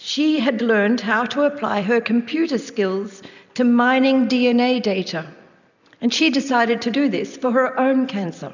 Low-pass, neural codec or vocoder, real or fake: 7.2 kHz; none; real